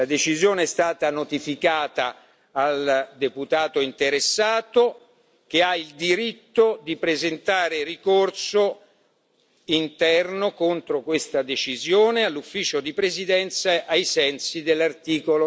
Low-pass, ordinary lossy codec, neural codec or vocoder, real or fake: none; none; none; real